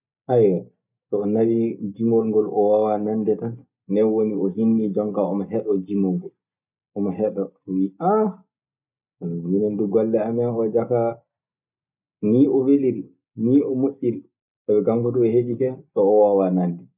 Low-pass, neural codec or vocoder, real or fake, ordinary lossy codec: 3.6 kHz; none; real; none